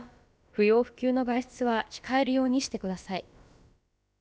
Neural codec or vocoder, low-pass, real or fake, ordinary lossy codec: codec, 16 kHz, about 1 kbps, DyCAST, with the encoder's durations; none; fake; none